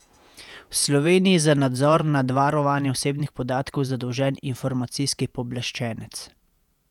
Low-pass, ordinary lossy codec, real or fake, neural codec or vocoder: 19.8 kHz; none; fake; vocoder, 48 kHz, 128 mel bands, Vocos